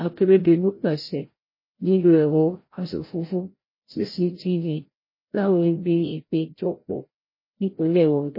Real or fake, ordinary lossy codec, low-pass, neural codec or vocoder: fake; MP3, 24 kbps; 5.4 kHz; codec, 16 kHz, 0.5 kbps, FreqCodec, larger model